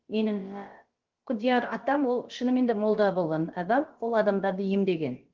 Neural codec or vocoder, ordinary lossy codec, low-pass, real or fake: codec, 16 kHz, about 1 kbps, DyCAST, with the encoder's durations; Opus, 16 kbps; 7.2 kHz; fake